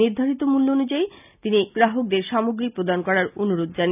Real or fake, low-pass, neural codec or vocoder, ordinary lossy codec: real; 3.6 kHz; none; none